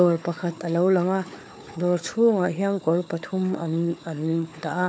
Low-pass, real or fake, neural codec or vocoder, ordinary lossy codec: none; fake; codec, 16 kHz, 4 kbps, FunCodec, trained on Chinese and English, 50 frames a second; none